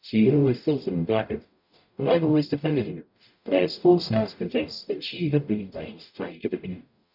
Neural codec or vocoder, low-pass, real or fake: codec, 44.1 kHz, 0.9 kbps, DAC; 5.4 kHz; fake